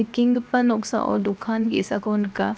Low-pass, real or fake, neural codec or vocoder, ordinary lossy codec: none; fake; codec, 16 kHz, 0.7 kbps, FocalCodec; none